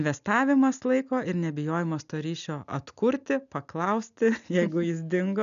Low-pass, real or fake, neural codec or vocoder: 7.2 kHz; real; none